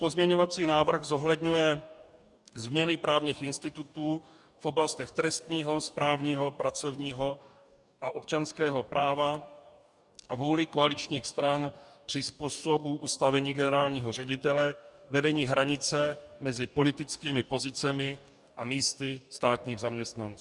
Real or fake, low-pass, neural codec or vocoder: fake; 10.8 kHz; codec, 44.1 kHz, 2.6 kbps, DAC